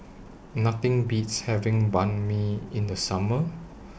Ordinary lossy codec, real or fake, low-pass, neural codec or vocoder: none; real; none; none